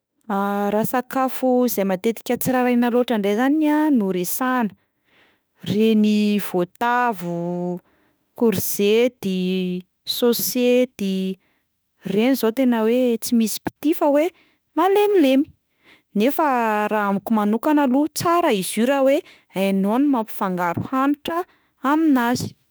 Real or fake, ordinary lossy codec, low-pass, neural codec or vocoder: fake; none; none; autoencoder, 48 kHz, 32 numbers a frame, DAC-VAE, trained on Japanese speech